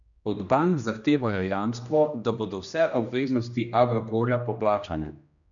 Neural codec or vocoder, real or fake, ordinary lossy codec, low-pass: codec, 16 kHz, 1 kbps, X-Codec, HuBERT features, trained on general audio; fake; none; 7.2 kHz